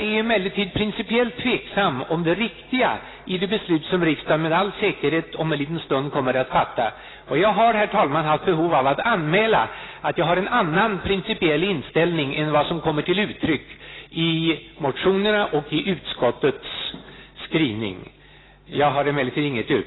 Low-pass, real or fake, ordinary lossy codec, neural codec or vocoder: 7.2 kHz; real; AAC, 16 kbps; none